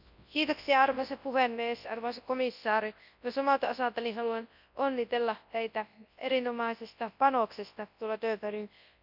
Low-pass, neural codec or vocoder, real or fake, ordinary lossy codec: 5.4 kHz; codec, 24 kHz, 0.9 kbps, WavTokenizer, large speech release; fake; none